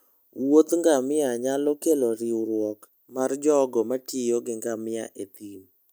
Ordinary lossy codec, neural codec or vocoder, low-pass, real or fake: none; none; none; real